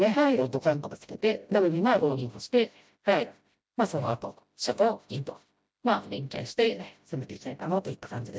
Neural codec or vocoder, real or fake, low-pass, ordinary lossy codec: codec, 16 kHz, 0.5 kbps, FreqCodec, smaller model; fake; none; none